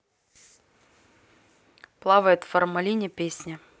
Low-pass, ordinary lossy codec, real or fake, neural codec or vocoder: none; none; real; none